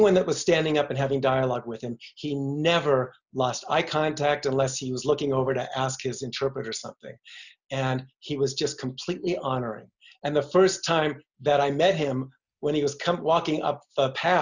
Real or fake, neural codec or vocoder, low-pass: real; none; 7.2 kHz